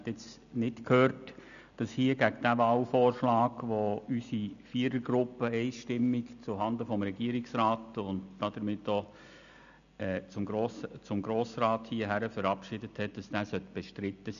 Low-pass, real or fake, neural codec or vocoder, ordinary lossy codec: 7.2 kHz; real; none; none